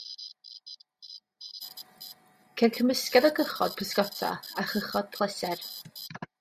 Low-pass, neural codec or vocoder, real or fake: 14.4 kHz; none; real